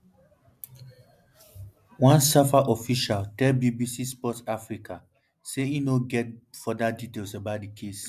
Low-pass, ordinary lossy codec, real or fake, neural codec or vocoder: 14.4 kHz; MP3, 96 kbps; real; none